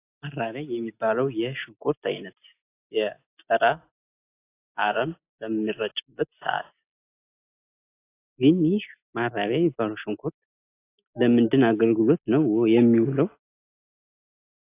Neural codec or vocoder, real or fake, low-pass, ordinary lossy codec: none; real; 3.6 kHz; AAC, 24 kbps